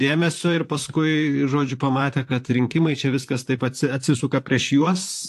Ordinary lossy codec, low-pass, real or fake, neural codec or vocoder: AAC, 64 kbps; 14.4 kHz; fake; vocoder, 44.1 kHz, 128 mel bands, Pupu-Vocoder